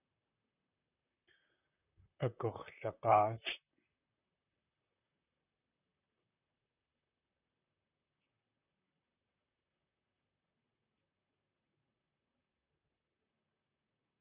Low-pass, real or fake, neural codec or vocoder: 3.6 kHz; fake; vocoder, 44.1 kHz, 128 mel bands, Pupu-Vocoder